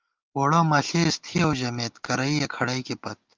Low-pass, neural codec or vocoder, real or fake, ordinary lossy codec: 7.2 kHz; none; real; Opus, 32 kbps